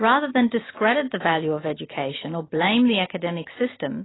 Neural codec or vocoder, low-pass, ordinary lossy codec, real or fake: none; 7.2 kHz; AAC, 16 kbps; real